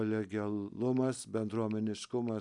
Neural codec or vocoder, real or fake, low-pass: vocoder, 44.1 kHz, 128 mel bands every 256 samples, BigVGAN v2; fake; 10.8 kHz